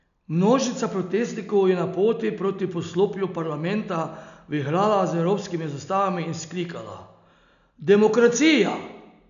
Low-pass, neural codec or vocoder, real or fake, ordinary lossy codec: 7.2 kHz; none; real; none